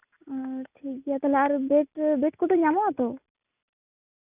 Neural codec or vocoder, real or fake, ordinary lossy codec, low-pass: none; real; none; 3.6 kHz